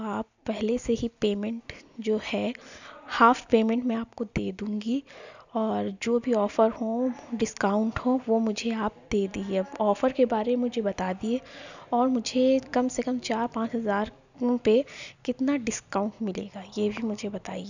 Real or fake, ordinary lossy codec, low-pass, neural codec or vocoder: real; none; 7.2 kHz; none